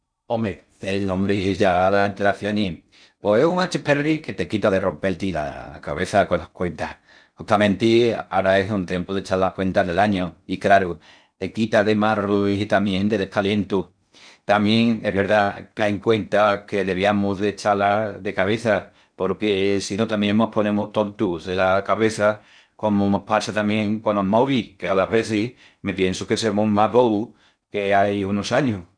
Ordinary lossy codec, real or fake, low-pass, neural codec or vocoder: none; fake; 9.9 kHz; codec, 16 kHz in and 24 kHz out, 0.6 kbps, FocalCodec, streaming, 2048 codes